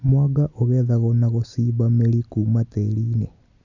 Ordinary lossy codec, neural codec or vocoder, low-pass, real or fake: none; none; 7.2 kHz; real